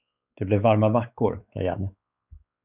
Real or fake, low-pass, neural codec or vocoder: fake; 3.6 kHz; codec, 16 kHz, 4 kbps, X-Codec, WavLM features, trained on Multilingual LibriSpeech